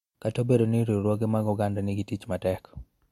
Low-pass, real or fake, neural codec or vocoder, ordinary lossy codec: 19.8 kHz; real; none; MP3, 64 kbps